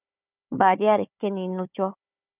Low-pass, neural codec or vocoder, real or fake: 3.6 kHz; codec, 16 kHz, 4 kbps, FunCodec, trained on Chinese and English, 50 frames a second; fake